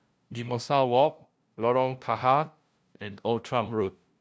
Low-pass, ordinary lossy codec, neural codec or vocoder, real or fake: none; none; codec, 16 kHz, 0.5 kbps, FunCodec, trained on LibriTTS, 25 frames a second; fake